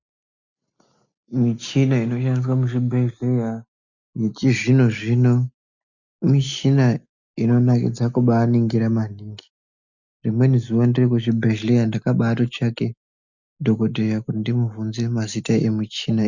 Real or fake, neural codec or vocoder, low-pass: real; none; 7.2 kHz